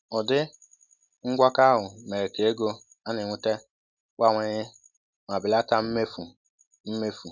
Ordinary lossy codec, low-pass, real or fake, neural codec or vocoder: none; 7.2 kHz; real; none